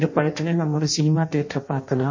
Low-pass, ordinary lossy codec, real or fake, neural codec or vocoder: 7.2 kHz; MP3, 32 kbps; fake; codec, 16 kHz in and 24 kHz out, 0.6 kbps, FireRedTTS-2 codec